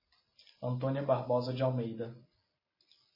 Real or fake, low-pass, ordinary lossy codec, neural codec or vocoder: real; 5.4 kHz; MP3, 24 kbps; none